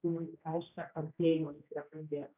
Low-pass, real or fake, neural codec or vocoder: 3.6 kHz; fake; codec, 16 kHz, 1 kbps, X-Codec, HuBERT features, trained on general audio